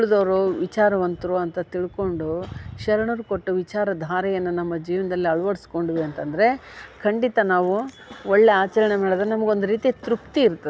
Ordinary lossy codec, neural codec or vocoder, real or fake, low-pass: none; none; real; none